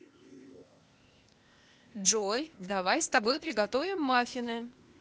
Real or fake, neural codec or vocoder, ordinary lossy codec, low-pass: fake; codec, 16 kHz, 0.8 kbps, ZipCodec; none; none